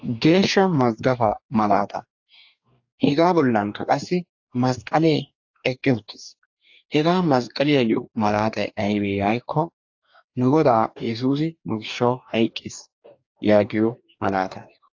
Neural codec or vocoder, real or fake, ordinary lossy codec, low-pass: codec, 44.1 kHz, 2.6 kbps, DAC; fake; AAC, 48 kbps; 7.2 kHz